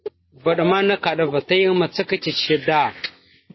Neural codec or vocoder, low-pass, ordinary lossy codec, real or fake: none; 7.2 kHz; MP3, 24 kbps; real